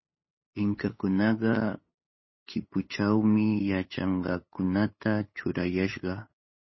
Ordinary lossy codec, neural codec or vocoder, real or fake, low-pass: MP3, 24 kbps; codec, 16 kHz, 8 kbps, FunCodec, trained on LibriTTS, 25 frames a second; fake; 7.2 kHz